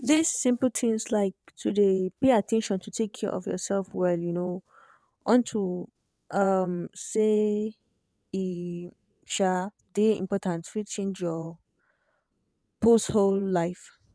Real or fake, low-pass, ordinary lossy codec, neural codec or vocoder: fake; none; none; vocoder, 22.05 kHz, 80 mel bands, WaveNeXt